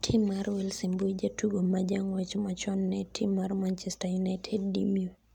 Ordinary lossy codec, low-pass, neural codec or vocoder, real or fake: none; 19.8 kHz; none; real